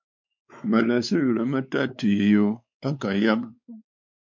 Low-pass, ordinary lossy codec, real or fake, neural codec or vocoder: 7.2 kHz; MP3, 48 kbps; fake; codec, 16 kHz, 4 kbps, X-Codec, WavLM features, trained on Multilingual LibriSpeech